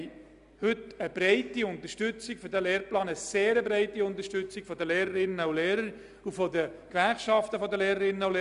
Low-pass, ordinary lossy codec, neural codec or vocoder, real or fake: 10.8 kHz; none; none; real